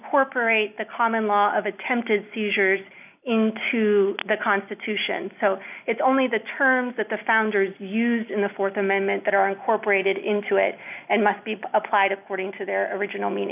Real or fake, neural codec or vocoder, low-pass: real; none; 3.6 kHz